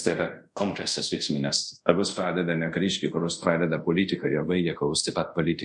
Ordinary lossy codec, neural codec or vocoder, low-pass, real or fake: MP3, 96 kbps; codec, 24 kHz, 0.5 kbps, DualCodec; 10.8 kHz; fake